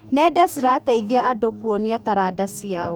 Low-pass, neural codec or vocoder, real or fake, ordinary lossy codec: none; codec, 44.1 kHz, 2.6 kbps, DAC; fake; none